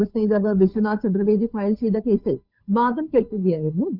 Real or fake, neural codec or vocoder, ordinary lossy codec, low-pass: fake; codec, 16 kHz, 2 kbps, FunCodec, trained on Chinese and English, 25 frames a second; none; 5.4 kHz